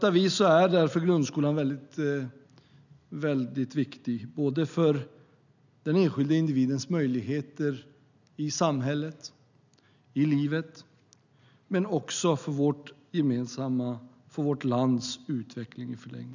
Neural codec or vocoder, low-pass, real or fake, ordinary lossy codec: none; 7.2 kHz; real; none